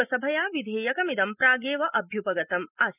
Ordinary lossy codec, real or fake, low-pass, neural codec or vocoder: none; real; 3.6 kHz; none